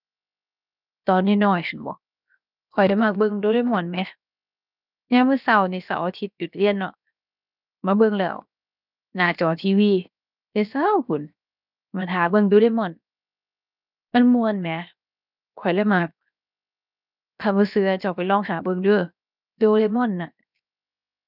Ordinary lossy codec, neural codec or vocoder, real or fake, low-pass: none; codec, 16 kHz, 0.7 kbps, FocalCodec; fake; 5.4 kHz